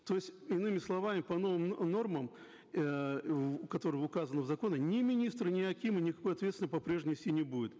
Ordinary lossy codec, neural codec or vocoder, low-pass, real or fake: none; none; none; real